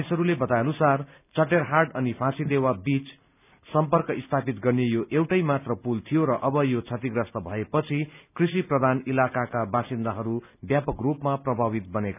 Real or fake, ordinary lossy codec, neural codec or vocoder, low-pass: real; none; none; 3.6 kHz